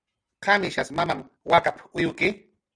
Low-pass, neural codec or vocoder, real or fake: 9.9 kHz; none; real